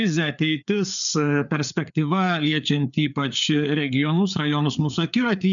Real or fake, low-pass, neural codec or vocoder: fake; 7.2 kHz; codec, 16 kHz, 4 kbps, FreqCodec, larger model